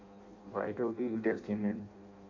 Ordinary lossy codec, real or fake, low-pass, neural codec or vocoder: MP3, 48 kbps; fake; 7.2 kHz; codec, 16 kHz in and 24 kHz out, 0.6 kbps, FireRedTTS-2 codec